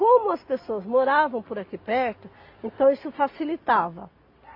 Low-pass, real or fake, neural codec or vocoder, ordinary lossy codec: 5.4 kHz; real; none; AAC, 32 kbps